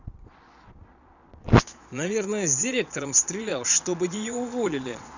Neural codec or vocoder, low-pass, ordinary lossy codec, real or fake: vocoder, 22.05 kHz, 80 mel bands, Vocos; 7.2 kHz; none; fake